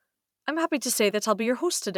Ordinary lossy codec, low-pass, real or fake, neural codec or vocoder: none; 19.8 kHz; fake; vocoder, 44.1 kHz, 128 mel bands every 512 samples, BigVGAN v2